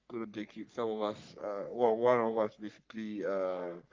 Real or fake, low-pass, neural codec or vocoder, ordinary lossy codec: fake; 7.2 kHz; codec, 44.1 kHz, 3.4 kbps, Pupu-Codec; Opus, 24 kbps